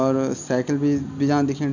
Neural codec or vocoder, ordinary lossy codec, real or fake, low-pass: none; none; real; 7.2 kHz